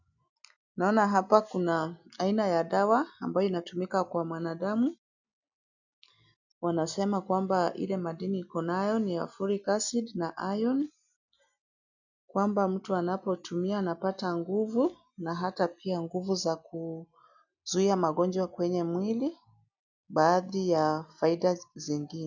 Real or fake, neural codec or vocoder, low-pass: real; none; 7.2 kHz